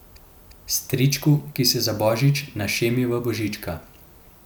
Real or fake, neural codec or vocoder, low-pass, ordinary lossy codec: real; none; none; none